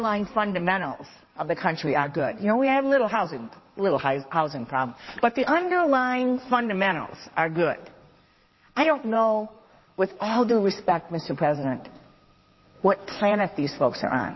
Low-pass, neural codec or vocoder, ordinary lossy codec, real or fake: 7.2 kHz; codec, 16 kHz in and 24 kHz out, 2.2 kbps, FireRedTTS-2 codec; MP3, 24 kbps; fake